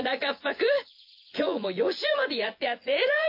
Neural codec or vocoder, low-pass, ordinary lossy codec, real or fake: vocoder, 24 kHz, 100 mel bands, Vocos; 5.4 kHz; MP3, 32 kbps; fake